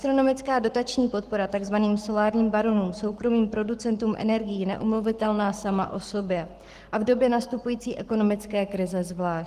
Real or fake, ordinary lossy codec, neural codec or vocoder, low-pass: fake; Opus, 24 kbps; codec, 44.1 kHz, 7.8 kbps, Pupu-Codec; 14.4 kHz